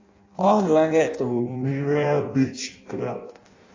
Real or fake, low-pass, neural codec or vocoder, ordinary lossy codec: fake; 7.2 kHz; codec, 16 kHz in and 24 kHz out, 0.6 kbps, FireRedTTS-2 codec; AAC, 32 kbps